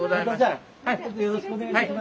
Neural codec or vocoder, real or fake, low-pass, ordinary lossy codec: none; real; none; none